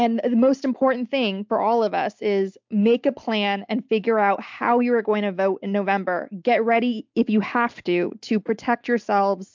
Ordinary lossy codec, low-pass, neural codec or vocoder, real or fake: MP3, 64 kbps; 7.2 kHz; none; real